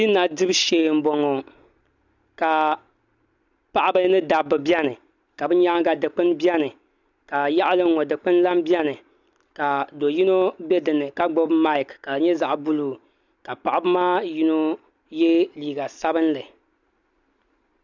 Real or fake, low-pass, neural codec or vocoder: real; 7.2 kHz; none